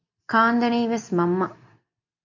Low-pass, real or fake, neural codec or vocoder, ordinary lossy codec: 7.2 kHz; real; none; MP3, 48 kbps